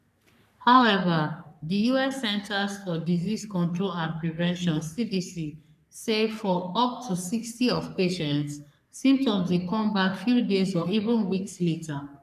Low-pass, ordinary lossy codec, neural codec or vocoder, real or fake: 14.4 kHz; none; codec, 44.1 kHz, 3.4 kbps, Pupu-Codec; fake